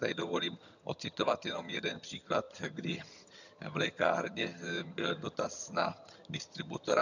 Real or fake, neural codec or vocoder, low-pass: fake; vocoder, 22.05 kHz, 80 mel bands, HiFi-GAN; 7.2 kHz